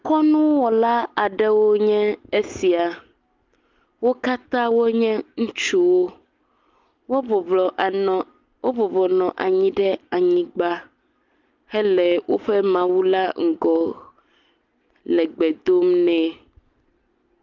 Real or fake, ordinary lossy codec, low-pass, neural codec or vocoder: real; Opus, 32 kbps; 7.2 kHz; none